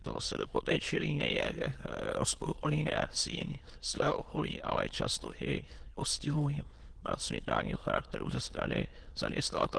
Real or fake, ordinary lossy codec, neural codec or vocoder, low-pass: fake; Opus, 16 kbps; autoencoder, 22.05 kHz, a latent of 192 numbers a frame, VITS, trained on many speakers; 9.9 kHz